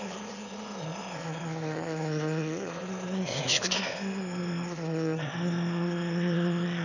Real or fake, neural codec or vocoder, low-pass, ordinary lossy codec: fake; autoencoder, 22.05 kHz, a latent of 192 numbers a frame, VITS, trained on one speaker; 7.2 kHz; none